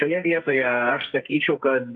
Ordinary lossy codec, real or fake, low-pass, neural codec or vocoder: MP3, 96 kbps; fake; 9.9 kHz; codec, 44.1 kHz, 2.6 kbps, SNAC